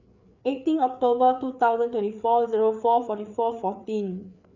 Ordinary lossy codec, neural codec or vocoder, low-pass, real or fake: none; codec, 16 kHz, 4 kbps, FreqCodec, larger model; 7.2 kHz; fake